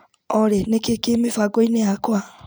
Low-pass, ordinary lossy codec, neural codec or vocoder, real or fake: none; none; none; real